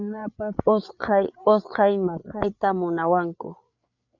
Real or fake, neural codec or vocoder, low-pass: fake; codec, 16 kHz, 8 kbps, FreqCodec, larger model; 7.2 kHz